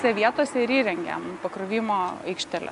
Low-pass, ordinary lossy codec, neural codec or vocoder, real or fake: 10.8 kHz; AAC, 64 kbps; none; real